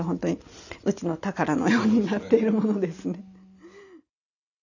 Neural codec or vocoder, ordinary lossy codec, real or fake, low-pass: none; none; real; 7.2 kHz